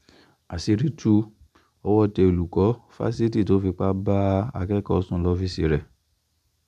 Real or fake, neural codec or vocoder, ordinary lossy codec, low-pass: real; none; none; 14.4 kHz